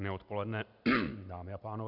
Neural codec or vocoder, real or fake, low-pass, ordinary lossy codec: none; real; 5.4 kHz; MP3, 48 kbps